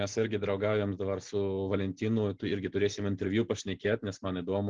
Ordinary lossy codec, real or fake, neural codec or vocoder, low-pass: Opus, 16 kbps; real; none; 7.2 kHz